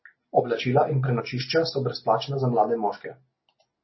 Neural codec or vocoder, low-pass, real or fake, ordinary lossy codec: none; 7.2 kHz; real; MP3, 24 kbps